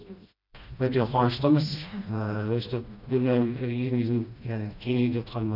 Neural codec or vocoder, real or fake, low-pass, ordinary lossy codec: codec, 16 kHz, 1 kbps, FreqCodec, smaller model; fake; 5.4 kHz; none